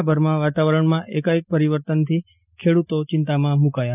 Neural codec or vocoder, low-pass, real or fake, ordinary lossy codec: none; 3.6 kHz; real; none